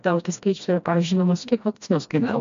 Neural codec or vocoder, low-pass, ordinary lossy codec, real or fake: codec, 16 kHz, 1 kbps, FreqCodec, smaller model; 7.2 kHz; MP3, 64 kbps; fake